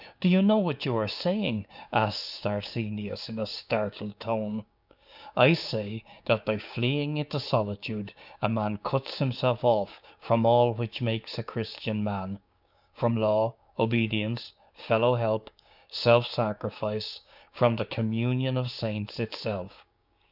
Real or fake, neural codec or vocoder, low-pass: fake; codec, 16 kHz, 6 kbps, DAC; 5.4 kHz